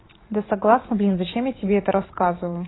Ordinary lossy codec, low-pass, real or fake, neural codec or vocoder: AAC, 16 kbps; 7.2 kHz; fake; vocoder, 44.1 kHz, 80 mel bands, Vocos